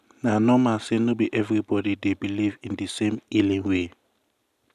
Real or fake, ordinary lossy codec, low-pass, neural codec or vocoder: real; none; 14.4 kHz; none